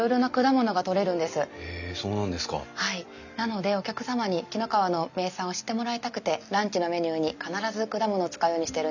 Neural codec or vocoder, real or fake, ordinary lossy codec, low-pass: none; real; none; 7.2 kHz